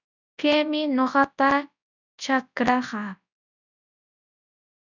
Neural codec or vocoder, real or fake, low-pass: codec, 24 kHz, 0.9 kbps, WavTokenizer, large speech release; fake; 7.2 kHz